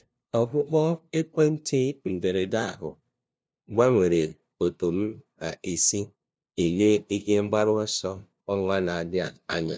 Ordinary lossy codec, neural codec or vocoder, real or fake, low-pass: none; codec, 16 kHz, 0.5 kbps, FunCodec, trained on LibriTTS, 25 frames a second; fake; none